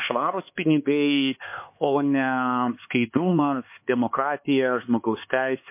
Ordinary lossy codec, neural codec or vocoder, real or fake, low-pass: MP3, 24 kbps; codec, 16 kHz, 4 kbps, X-Codec, HuBERT features, trained on LibriSpeech; fake; 3.6 kHz